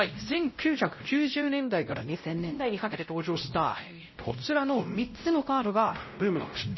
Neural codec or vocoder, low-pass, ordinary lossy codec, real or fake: codec, 16 kHz, 0.5 kbps, X-Codec, WavLM features, trained on Multilingual LibriSpeech; 7.2 kHz; MP3, 24 kbps; fake